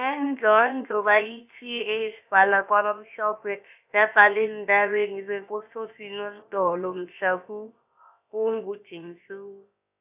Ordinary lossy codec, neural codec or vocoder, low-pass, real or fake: AAC, 32 kbps; codec, 16 kHz, about 1 kbps, DyCAST, with the encoder's durations; 3.6 kHz; fake